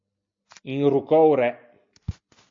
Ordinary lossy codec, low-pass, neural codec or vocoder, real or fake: AAC, 64 kbps; 7.2 kHz; none; real